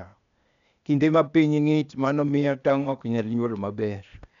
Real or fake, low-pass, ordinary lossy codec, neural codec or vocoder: fake; 7.2 kHz; none; codec, 16 kHz, 0.8 kbps, ZipCodec